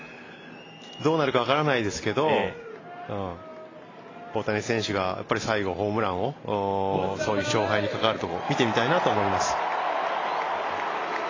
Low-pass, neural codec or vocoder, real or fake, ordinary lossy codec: 7.2 kHz; none; real; AAC, 32 kbps